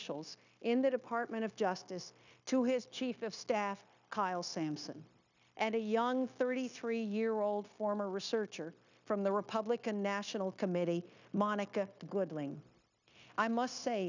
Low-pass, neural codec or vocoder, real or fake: 7.2 kHz; codec, 16 kHz, 0.9 kbps, LongCat-Audio-Codec; fake